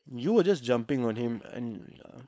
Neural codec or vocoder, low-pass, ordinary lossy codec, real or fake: codec, 16 kHz, 4.8 kbps, FACodec; none; none; fake